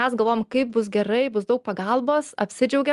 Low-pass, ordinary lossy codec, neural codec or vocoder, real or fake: 10.8 kHz; Opus, 32 kbps; none; real